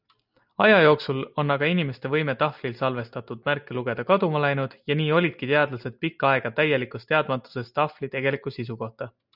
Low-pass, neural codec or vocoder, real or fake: 5.4 kHz; none; real